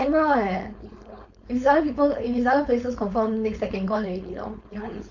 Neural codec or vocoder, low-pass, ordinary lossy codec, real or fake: codec, 16 kHz, 4.8 kbps, FACodec; 7.2 kHz; Opus, 64 kbps; fake